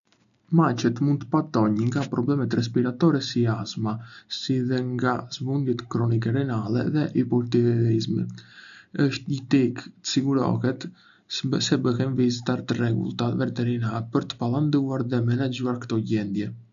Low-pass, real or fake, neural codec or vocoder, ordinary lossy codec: 7.2 kHz; real; none; none